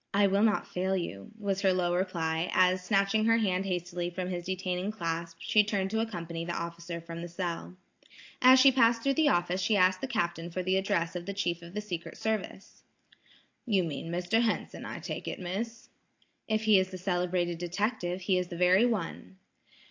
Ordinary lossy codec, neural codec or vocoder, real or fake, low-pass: AAC, 48 kbps; none; real; 7.2 kHz